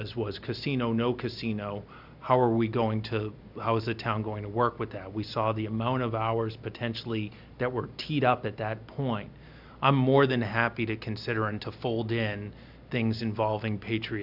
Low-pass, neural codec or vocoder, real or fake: 5.4 kHz; none; real